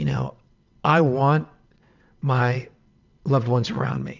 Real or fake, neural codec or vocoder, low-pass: fake; vocoder, 22.05 kHz, 80 mel bands, WaveNeXt; 7.2 kHz